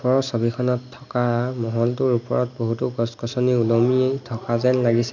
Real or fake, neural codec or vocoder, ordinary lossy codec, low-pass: real; none; none; 7.2 kHz